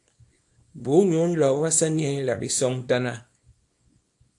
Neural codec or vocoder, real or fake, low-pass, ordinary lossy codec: codec, 24 kHz, 0.9 kbps, WavTokenizer, small release; fake; 10.8 kHz; AAC, 64 kbps